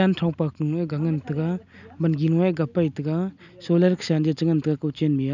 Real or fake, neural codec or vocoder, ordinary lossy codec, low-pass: real; none; none; 7.2 kHz